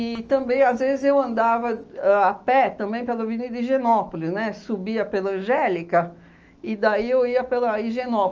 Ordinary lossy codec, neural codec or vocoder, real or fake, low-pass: Opus, 24 kbps; autoencoder, 48 kHz, 128 numbers a frame, DAC-VAE, trained on Japanese speech; fake; 7.2 kHz